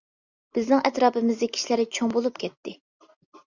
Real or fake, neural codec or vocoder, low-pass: real; none; 7.2 kHz